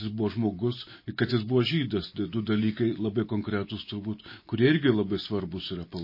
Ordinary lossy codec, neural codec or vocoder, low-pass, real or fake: MP3, 24 kbps; none; 5.4 kHz; real